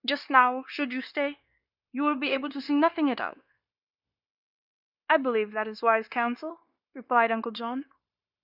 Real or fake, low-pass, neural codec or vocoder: fake; 5.4 kHz; codec, 16 kHz, 0.9 kbps, LongCat-Audio-Codec